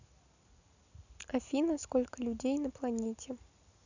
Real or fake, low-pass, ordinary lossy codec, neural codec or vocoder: real; 7.2 kHz; none; none